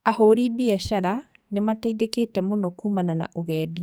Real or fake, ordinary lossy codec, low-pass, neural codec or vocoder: fake; none; none; codec, 44.1 kHz, 2.6 kbps, SNAC